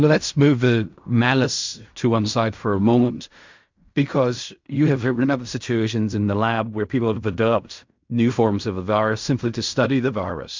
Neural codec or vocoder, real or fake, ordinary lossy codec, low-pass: codec, 16 kHz in and 24 kHz out, 0.4 kbps, LongCat-Audio-Codec, fine tuned four codebook decoder; fake; MP3, 64 kbps; 7.2 kHz